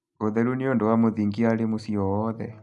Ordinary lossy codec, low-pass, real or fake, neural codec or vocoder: none; 10.8 kHz; real; none